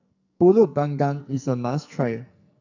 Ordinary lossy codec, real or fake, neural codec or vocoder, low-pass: none; fake; codec, 44.1 kHz, 2.6 kbps, SNAC; 7.2 kHz